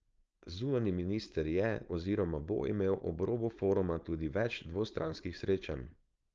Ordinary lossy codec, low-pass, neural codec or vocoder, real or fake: Opus, 24 kbps; 7.2 kHz; codec, 16 kHz, 4.8 kbps, FACodec; fake